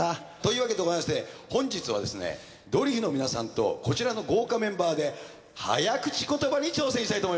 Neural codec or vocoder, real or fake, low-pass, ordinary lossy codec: none; real; none; none